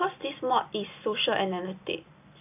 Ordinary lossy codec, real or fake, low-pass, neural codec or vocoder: AAC, 32 kbps; real; 3.6 kHz; none